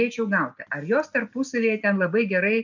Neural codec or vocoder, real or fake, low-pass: none; real; 7.2 kHz